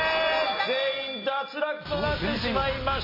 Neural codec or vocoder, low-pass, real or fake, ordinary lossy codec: none; 5.4 kHz; real; MP3, 24 kbps